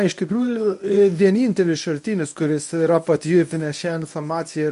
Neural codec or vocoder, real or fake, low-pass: codec, 24 kHz, 0.9 kbps, WavTokenizer, medium speech release version 1; fake; 10.8 kHz